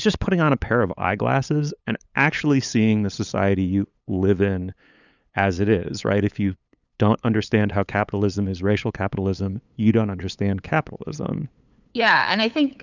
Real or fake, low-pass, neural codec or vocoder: fake; 7.2 kHz; codec, 16 kHz, 8 kbps, FunCodec, trained on LibriTTS, 25 frames a second